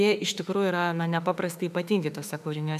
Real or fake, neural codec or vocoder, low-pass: fake; autoencoder, 48 kHz, 32 numbers a frame, DAC-VAE, trained on Japanese speech; 14.4 kHz